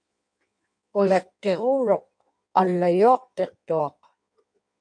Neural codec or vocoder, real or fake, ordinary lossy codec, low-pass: codec, 16 kHz in and 24 kHz out, 1.1 kbps, FireRedTTS-2 codec; fake; MP3, 64 kbps; 9.9 kHz